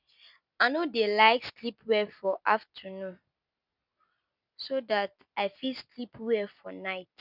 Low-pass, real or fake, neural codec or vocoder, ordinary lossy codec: 5.4 kHz; real; none; none